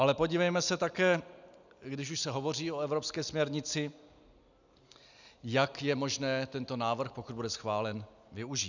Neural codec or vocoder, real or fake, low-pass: none; real; 7.2 kHz